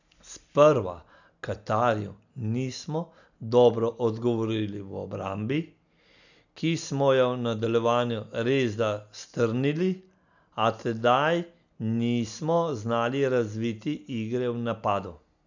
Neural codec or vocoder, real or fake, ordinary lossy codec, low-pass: none; real; none; 7.2 kHz